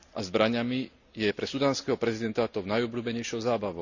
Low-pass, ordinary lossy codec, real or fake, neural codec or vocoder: 7.2 kHz; none; real; none